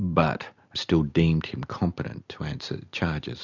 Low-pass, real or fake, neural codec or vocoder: 7.2 kHz; real; none